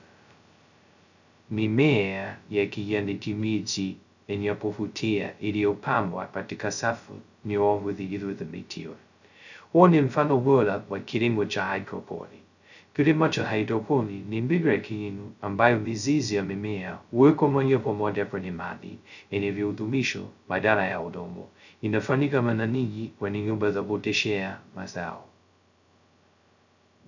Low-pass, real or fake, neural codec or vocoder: 7.2 kHz; fake; codec, 16 kHz, 0.2 kbps, FocalCodec